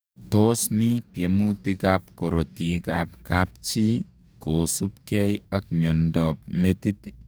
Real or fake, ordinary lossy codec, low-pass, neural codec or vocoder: fake; none; none; codec, 44.1 kHz, 2.6 kbps, DAC